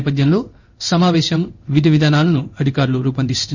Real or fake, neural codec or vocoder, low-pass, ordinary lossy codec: fake; codec, 16 kHz in and 24 kHz out, 1 kbps, XY-Tokenizer; 7.2 kHz; none